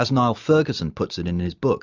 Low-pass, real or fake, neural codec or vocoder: 7.2 kHz; real; none